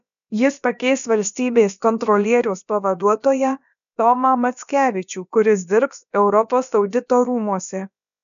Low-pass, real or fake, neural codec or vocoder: 7.2 kHz; fake; codec, 16 kHz, about 1 kbps, DyCAST, with the encoder's durations